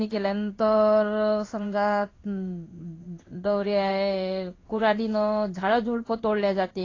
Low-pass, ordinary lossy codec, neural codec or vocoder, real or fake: 7.2 kHz; AAC, 32 kbps; codec, 16 kHz in and 24 kHz out, 1 kbps, XY-Tokenizer; fake